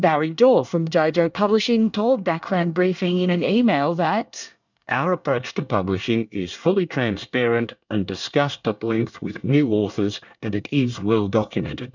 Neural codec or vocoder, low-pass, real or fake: codec, 24 kHz, 1 kbps, SNAC; 7.2 kHz; fake